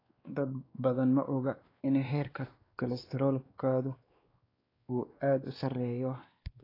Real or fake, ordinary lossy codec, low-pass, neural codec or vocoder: fake; AAC, 24 kbps; 5.4 kHz; codec, 16 kHz, 2 kbps, X-Codec, WavLM features, trained on Multilingual LibriSpeech